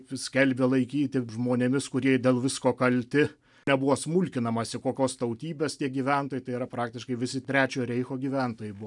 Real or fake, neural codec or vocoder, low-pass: real; none; 10.8 kHz